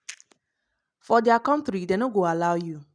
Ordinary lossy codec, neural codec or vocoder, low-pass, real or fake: none; none; 9.9 kHz; real